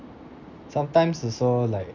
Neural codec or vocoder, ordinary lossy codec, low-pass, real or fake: none; none; 7.2 kHz; real